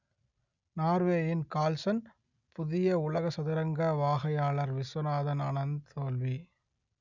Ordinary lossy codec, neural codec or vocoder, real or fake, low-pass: none; none; real; 7.2 kHz